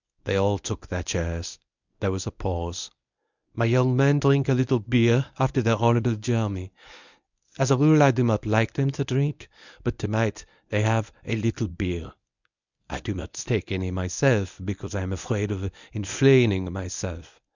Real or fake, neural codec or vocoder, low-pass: fake; codec, 24 kHz, 0.9 kbps, WavTokenizer, medium speech release version 1; 7.2 kHz